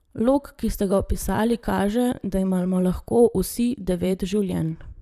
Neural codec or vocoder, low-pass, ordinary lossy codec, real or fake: vocoder, 44.1 kHz, 128 mel bands, Pupu-Vocoder; 14.4 kHz; none; fake